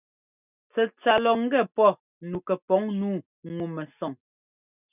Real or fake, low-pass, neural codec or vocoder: real; 3.6 kHz; none